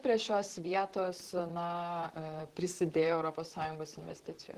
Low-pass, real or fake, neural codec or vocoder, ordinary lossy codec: 14.4 kHz; fake; vocoder, 44.1 kHz, 128 mel bands, Pupu-Vocoder; Opus, 16 kbps